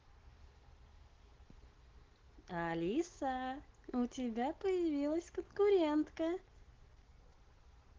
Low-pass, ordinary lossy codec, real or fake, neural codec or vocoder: 7.2 kHz; Opus, 16 kbps; real; none